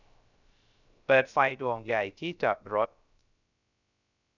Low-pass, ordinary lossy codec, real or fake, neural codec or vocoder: 7.2 kHz; none; fake; codec, 16 kHz, 0.3 kbps, FocalCodec